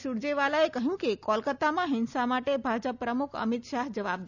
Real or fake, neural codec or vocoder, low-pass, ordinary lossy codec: real; none; 7.2 kHz; none